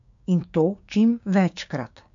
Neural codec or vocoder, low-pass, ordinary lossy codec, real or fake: codec, 16 kHz, 6 kbps, DAC; 7.2 kHz; AAC, 64 kbps; fake